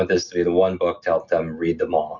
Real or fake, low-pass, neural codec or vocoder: real; 7.2 kHz; none